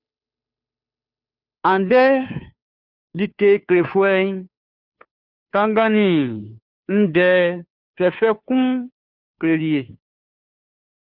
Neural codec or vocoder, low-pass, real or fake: codec, 16 kHz, 2 kbps, FunCodec, trained on Chinese and English, 25 frames a second; 5.4 kHz; fake